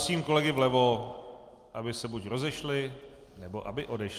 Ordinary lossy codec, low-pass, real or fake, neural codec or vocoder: Opus, 32 kbps; 14.4 kHz; real; none